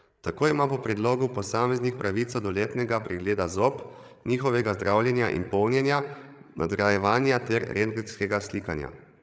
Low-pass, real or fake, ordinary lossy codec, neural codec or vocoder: none; fake; none; codec, 16 kHz, 8 kbps, FreqCodec, larger model